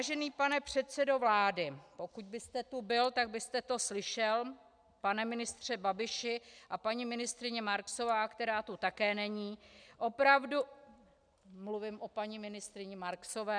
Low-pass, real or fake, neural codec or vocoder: 9.9 kHz; real; none